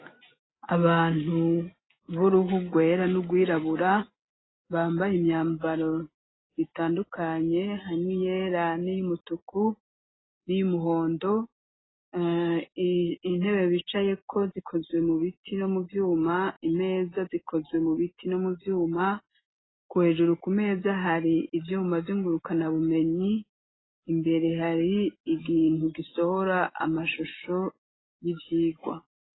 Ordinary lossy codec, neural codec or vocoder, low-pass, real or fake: AAC, 16 kbps; none; 7.2 kHz; real